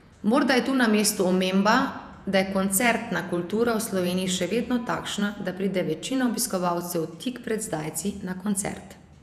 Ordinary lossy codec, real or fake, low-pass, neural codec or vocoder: none; fake; 14.4 kHz; vocoder, 48 kHz, 128 mel bands, Vocos